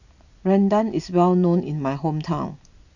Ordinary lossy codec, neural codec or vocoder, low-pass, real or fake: none; none; 7.2 kHz; real